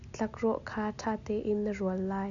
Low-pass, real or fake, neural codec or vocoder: 7.2 kHz; real; none